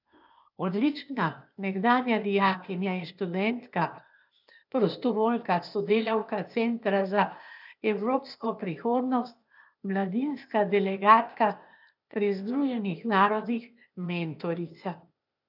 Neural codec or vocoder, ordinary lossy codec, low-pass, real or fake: codec, 16 kHz, 0.8 kbps, ZipCodec; none; 5.4 kHz; fake